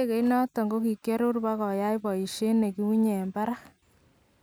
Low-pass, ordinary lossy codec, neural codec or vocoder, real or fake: none; none; none; real